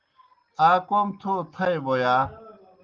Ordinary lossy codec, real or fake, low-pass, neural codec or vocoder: Opus, 32 kbps; real; 7.2 kHz; none